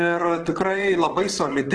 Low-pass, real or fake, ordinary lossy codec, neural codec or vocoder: 9.9 kHz; fake; Opus, 16 kbps; vocoder, 22.05 kHz, 80 mel bands, WaveNeXt